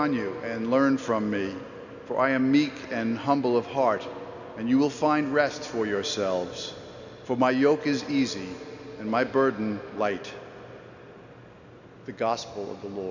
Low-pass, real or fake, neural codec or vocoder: 7.2 kHz; real; none